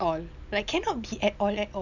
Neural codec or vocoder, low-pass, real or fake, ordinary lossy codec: none; 7.2 kHz; real; none